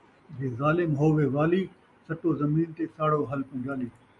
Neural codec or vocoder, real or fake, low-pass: none; real; 10.8 kHz